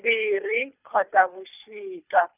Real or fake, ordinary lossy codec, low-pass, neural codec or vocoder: fake; none; 3.6 kHz; codec, 24 kHz, 3 kbps, HILCodec